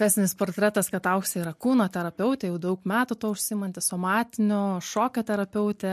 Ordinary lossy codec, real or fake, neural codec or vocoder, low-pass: MP3, 64 kbps; real; none; 14.4 kHz